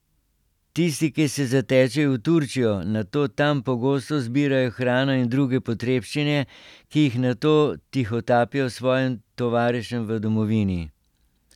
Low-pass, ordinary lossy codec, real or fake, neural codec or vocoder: 19.8 kHz; none; real; none